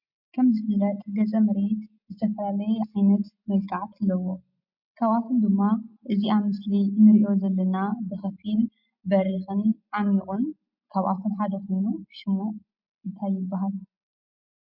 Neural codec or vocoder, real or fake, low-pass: none; real; 5.4 kHz